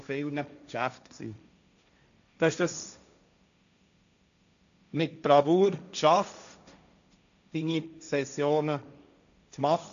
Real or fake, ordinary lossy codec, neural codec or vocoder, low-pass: fake; none; codec, 16 kHz, 1.1 kbps, Voila-Tokenizer; 7.2 kHz